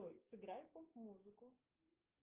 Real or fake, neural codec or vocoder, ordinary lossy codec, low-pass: fake; codec, 16 kHz, 6 kbps, DAC; Opus, 24 kbps; 3.6 kHz